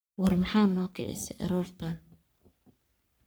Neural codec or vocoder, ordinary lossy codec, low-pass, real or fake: codec, 44.1 kHz, 3.4 kbps, Pupu-Codec; none; none; fake